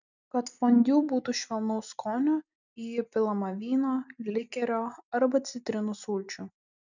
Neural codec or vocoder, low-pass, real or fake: none; 7.2 kHz; real